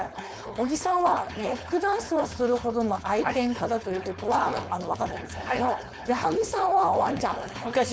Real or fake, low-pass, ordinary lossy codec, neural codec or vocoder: fake; none; none; codec, 16 kHz, 4.8 kbps, FACodec